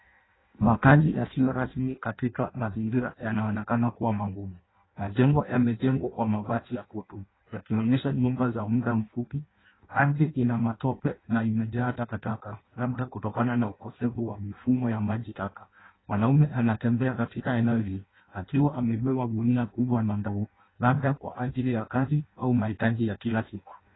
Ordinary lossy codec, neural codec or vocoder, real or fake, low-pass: AAC, 16 kbps; codec, 16 kHz in and 24 kHz out, 0.6 kbps, FireRedTTS-2 codec; fake; 7.2 kHz